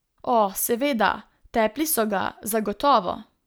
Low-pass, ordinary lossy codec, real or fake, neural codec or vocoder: none; none; real; none